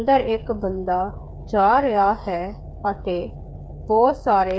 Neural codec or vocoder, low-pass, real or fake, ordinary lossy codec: codec, 16 kHz, 8 kbps, FreqCodec, smaller model; none; fake; none